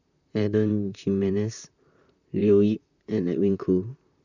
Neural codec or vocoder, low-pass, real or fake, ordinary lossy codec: vocoder, 44.1 kHz, 128 mel bands, Pupu-Vocoder; 7.2 kHz; fake; none